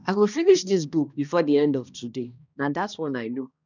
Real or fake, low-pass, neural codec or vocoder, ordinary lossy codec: fake; 7.2 kHz; codec, 16 kHz, 1 kbps, X-Codec, HuBERT features, trained on balanced general audio; none